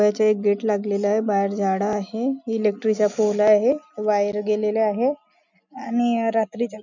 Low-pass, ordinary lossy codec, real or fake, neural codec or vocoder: 7.2 kHz; none; real; none